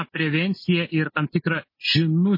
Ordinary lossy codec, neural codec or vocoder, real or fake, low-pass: MP3, 24 kbps; none; real; 5.4 kHz